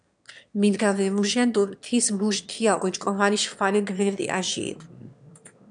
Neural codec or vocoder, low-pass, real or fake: autoencoder, 22.05 kHz, a latent of 192 numbers a frame, VITS, trained on one speaker; 9.9 kHz; fake